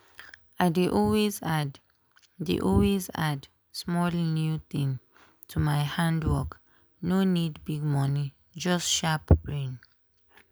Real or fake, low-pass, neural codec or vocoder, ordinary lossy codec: real; none; none; none